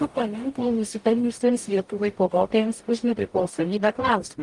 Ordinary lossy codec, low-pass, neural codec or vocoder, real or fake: Opus, 32 kbps; 10.8 kHz; codec, 44.1 kHz, 0.9 kbps, DAC; fake